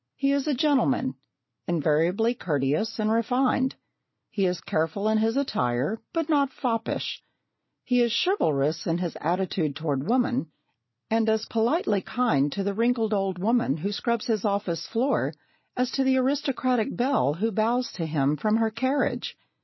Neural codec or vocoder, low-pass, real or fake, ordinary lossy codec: none; 7.2 kHz; real; MP3, 24 kbps